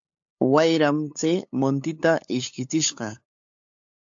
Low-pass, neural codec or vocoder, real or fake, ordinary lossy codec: 7.2 kHz; codec, 16 kHz, 8 kbps, FunCodec, trained on LibriTTS, 25 frames a second; fake; AAC, 64 kbps